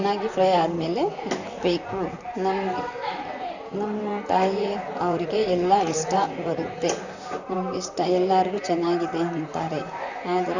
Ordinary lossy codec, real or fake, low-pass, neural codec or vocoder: none; fake; 7.2 kHz; vocoder, 44.1 kHz, 128 mel bands, Pupu-Vocoder